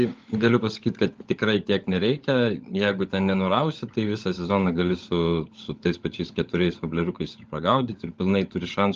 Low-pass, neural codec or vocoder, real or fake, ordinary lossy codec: 7.2 kHz; codec, 16 kHz, 16 kbps, FunCodec, trained on LibriTTS, 50 frames a second; fake; Opus, 32 kbps